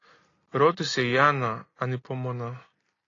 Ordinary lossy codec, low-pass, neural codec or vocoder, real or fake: AAC, 32 kbps; 7.2 kHz; none; real